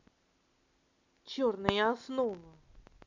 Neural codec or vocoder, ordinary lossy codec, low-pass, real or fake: none; MP3, 64 kbps; 7.2 kHz; real